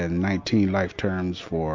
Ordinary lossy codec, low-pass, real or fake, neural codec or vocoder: MP3, 48 kbps; 7.2 kHz; real; none